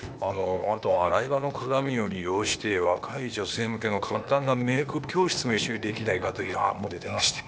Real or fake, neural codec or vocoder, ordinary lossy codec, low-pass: fake; codec, 16 kHz, 0.8 kbps, ZipCodec; none; none